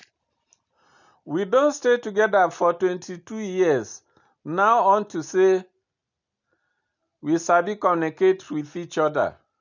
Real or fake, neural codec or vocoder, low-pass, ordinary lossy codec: real; none; 7.2 kHz; none